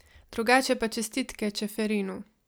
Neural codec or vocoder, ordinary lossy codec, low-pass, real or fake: none; none; none; real